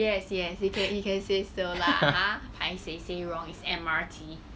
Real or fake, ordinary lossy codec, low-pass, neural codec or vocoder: real; none; none; none